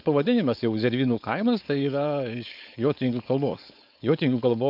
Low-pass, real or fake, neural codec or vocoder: 5.4 kHz; fake; codec, 16 kHz, 4.8 kbps, FACodec